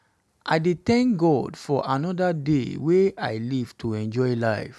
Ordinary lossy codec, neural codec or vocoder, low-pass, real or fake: none; none; none; real